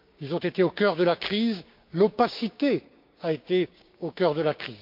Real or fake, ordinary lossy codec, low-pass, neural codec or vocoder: fake; MP3, 48 kbps; 5.4 kHz; codec, 16 kHz, 6 kbps, DAC